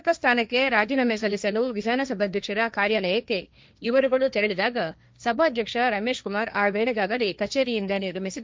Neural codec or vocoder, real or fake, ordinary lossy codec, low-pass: codec, 16 kHz, 1.1 kbps, Voila-Tokenizer; fake; none; none